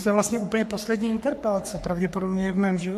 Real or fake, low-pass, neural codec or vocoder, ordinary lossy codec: fake; 14.4 kHz; codec, 44.1 kHz, 3.4 kbps, Pupu-Codec; AAC, 96 kbps